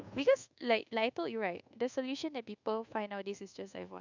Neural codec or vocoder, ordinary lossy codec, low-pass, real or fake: codec, 24 kHz, 1.2 kbps, DualCodec; none; 7.2 kHz; fake